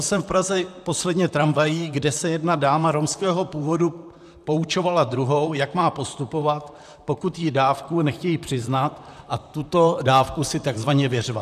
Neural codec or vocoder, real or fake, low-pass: vocoder, 44.1 kHz, 128 mel bands, Pupu-Vocoder; fake; 14.4 kHz